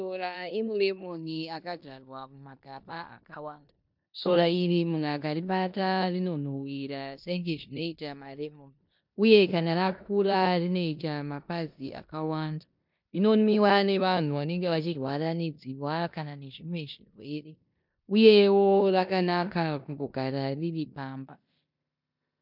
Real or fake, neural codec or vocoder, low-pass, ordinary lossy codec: fake; codec, 16 kHz in and 24 kHz out, 0.9 kbps, LongCat-Audio-Codec, four codebook decoder; 5.4 kHz; MP3, 48 kbps